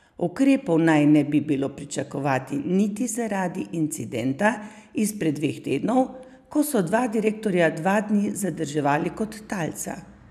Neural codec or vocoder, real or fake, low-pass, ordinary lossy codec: none; real; 14.4 kHz; none